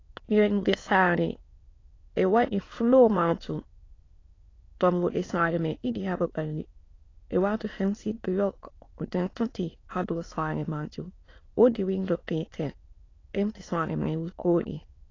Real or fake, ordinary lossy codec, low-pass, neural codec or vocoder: fake; AAC, 32 kbps; 7.2 kHz; autoencoder, 22.05 kHz, a latent of 192 numbers a frame, VITS, trained on many speakers